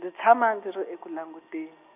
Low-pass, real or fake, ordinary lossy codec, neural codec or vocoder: 3.6 kHz; real; none; none